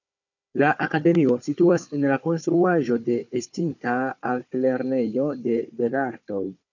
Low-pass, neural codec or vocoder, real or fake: 7.2 kHz; codec, 16 kHz, 4 kbps, FunCodec, trained on Chinese and English, 50 frames a second; fake